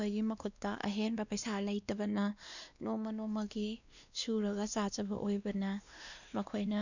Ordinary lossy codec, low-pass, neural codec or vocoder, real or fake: none; 7.2 kHz; codec, 16 kHz, 2 kbps, X-Codec, WavLM features, trained on Multilingual LibriSpeech; fake